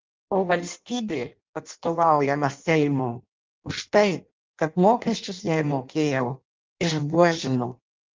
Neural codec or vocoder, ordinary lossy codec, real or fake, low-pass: codec, 16 kHz in and 24 kHz out, 0.6 kbps, FireRedTTS-2 codec; Opus, 24 kbps; fake; 7.2 kHz